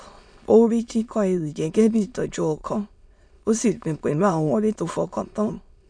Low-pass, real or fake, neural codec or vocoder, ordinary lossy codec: 9.9 kHz; fake; autoencoder, 22.05 kHz, a latent of 192 numbers a frame, VITS, trained on many speakers; none